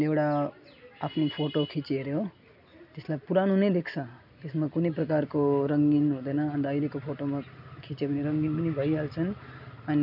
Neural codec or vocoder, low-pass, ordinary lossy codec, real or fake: none; 5.4 kHz; none; real